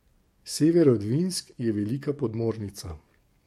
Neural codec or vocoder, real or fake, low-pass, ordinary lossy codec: codec, 44.1 kHz, 7.8 kbps, DAC; fake; 19.8 kHz; MP3, 64 kbps